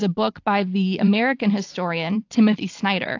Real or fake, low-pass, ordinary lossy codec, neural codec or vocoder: fake; 7.2 kHz; AAC, 48 kbps; vocoder, 44.1 kHz, 128 mel bands every 256 samples, BigVGAN v2